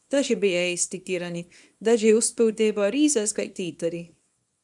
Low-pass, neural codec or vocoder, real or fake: 10.8 kHz; codec, 24 kHz, 0.9 kbps, WavTokenizer, small release; fake